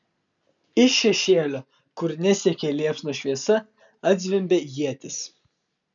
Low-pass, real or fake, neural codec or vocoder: 7.2 kHz; real; none